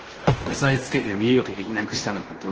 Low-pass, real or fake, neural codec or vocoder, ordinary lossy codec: 7.2 kHz; fake; codec, 16 kHz in and 24 kHz out, 0.9 kbps, LongCat-Audio-Codec, fine tuned four codebook decoder; Opus, 16 kbps